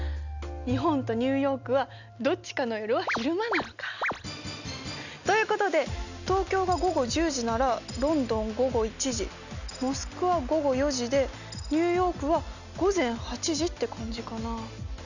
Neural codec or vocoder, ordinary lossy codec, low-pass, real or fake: none; none; 7.2 kHz; real